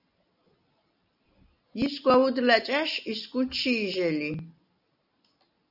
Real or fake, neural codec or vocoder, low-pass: real; none; 5.4 kHz